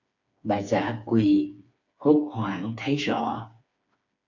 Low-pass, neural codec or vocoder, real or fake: 7.2 kHz; codec, 16 kHz, 4 kbps, FreqCodec, smaller model; fake